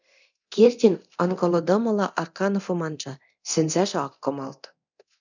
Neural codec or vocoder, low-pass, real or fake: codec, 24 kHz, 0.9 kbps, DualCodec; 7.2 kHz; fake